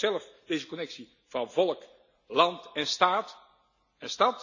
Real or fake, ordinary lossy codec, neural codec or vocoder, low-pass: real; none; none; 7.2 kHz